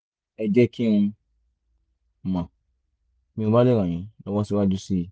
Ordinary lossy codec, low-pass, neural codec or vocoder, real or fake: none; none; none; real